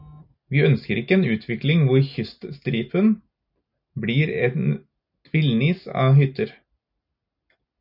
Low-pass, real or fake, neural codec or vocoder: 5.4 kHz; real; none